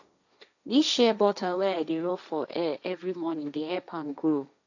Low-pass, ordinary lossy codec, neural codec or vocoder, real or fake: 7.2 kHz; none; codec, 16 kHz, 1.1 kbps, Voila-Tokenizer; fake